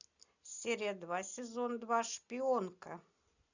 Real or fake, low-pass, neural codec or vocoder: real; 7.2 kHz; none